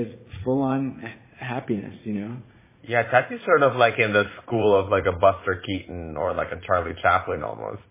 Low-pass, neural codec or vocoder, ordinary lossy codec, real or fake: 3.6 kHz; vocoder, 44.1 kHz, 80 mel bands, Vocos; MP3, 16 kbps; fake